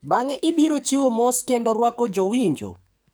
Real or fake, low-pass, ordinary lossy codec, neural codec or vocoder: fake; none; none; codec, 44.1 kHz, 2.6 kbps, SNAC